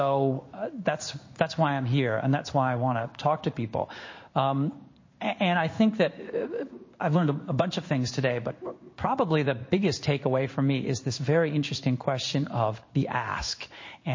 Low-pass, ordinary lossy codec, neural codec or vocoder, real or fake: 7.2 kHz; MP3, 32 kbps; codec, 16 kHz in and 24 kHz out, 1 kbps, XY-Tokenizer; fake